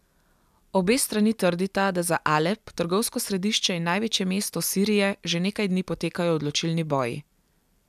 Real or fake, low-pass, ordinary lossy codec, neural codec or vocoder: real; 14.4 kHz; none; none